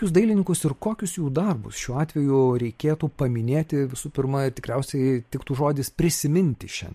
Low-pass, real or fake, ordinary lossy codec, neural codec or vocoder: 14.4 kHz; fake; MP3, 64 kbps; vocoder, 44.1 kHz, 128 mel bands every 512 samples, BigVGAN v2